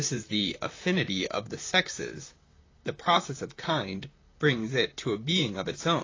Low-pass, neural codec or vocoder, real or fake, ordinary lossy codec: 7.2 kHz; vocoder, 44.1 kHz, 128 mel bands, Pupu-Vocoder; fake; AAC, 32 kbps